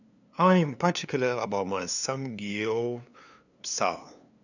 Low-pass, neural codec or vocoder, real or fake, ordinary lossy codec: 7.2 kHz; codec, 16 kHz, 2 kbps, FunCodec, trained on LibriTTS, 25 frames a second; fake; none